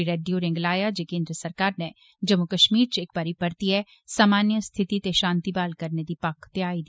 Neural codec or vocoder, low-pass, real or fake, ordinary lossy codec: none; none; real; none